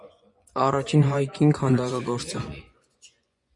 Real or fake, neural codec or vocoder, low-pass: fake; vocoder, 24 kHz, 100 mel bands, Vocos; 10.8 kHz